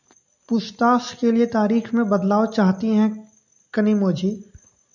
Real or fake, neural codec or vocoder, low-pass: real; none; 7.2 kHz